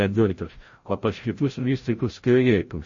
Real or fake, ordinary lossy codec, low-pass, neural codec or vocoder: fake; MP3, 32 kbps; 7.2 kHz; codec, 16 kHz, 0.5 kbps, FreqCodec, larger model